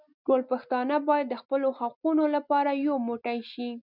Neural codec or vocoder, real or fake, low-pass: none; real; 5.4 kHz